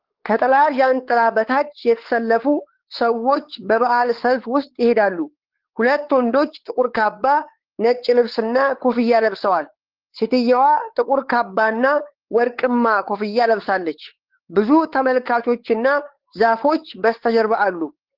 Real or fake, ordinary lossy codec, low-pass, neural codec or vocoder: fake; Opus, 16 kbps; 5.4 kHz; codec, 16 kHz, 4 kbps, X-Codec, WavLM features, trained on Multilingual LibriSpeech